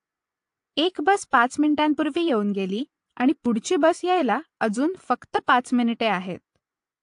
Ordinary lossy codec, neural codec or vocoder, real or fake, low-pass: AAC, 64 kbps; vocoder, 24 kHz, 100 mel bands, Vocos; fake; 10.8 kHz